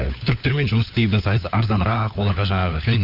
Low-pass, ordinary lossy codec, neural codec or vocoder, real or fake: 5.4 kHz; Opus, 64 kbps; codec, 16 kHz, 4 kbps, FunCodec, trained on LibriTTS, 50 frames a second; fake